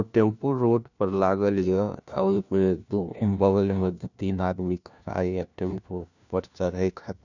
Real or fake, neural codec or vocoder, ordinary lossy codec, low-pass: fake; codec, 16 kHz, 1 kbps, FunCodec, trained on LibriTTS, 50 frames a second; none; 7.2 kHz